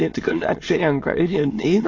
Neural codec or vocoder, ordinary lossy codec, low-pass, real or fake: autoencoder, 22.05 kHz, a latent of 192 numbers a frame, VITS, trained on many speakers; AAC, 32 kbps; 7.2 kHz; fake